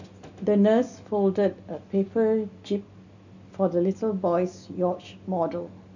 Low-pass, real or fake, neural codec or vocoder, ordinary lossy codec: 7.2 kHz; real; none; none